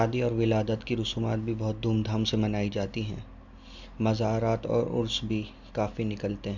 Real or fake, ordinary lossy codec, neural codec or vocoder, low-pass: real; none; none; 7.2 kHz